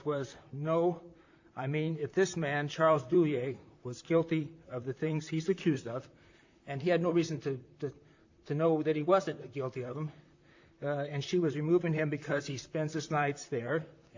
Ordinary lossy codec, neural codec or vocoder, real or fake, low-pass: AAC, 48 kbps; vocoder, 44.1 kHz, 128 mel bands, Pupu-Vocoder; fake; 7.2 kHz